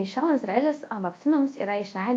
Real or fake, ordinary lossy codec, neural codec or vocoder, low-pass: fake; AAC, 48 kbps; codec, 24 kHz, 0.9 kbps, WavTokenizer, large speech release; 9.9 kHz